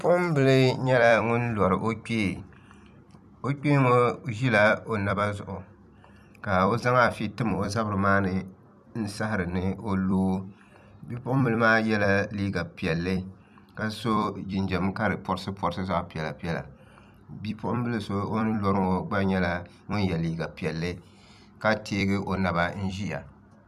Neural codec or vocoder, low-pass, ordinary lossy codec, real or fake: vocoder, 44.1 kHz, 128 mel bands every 256 samples, BigVGAN v2; 14.4 kHz; MP3, 96 kbps; fake